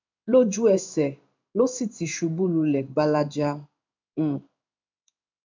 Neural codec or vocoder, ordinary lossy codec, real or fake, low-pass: codec, 16 kHz in and 24 kHz out, 1 kbps, XY-Tokenizer; MP3, 64 kbps; fake; 7.2 kHz